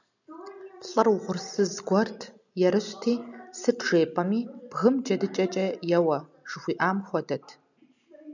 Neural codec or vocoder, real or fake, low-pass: none; real; 7.2 kHz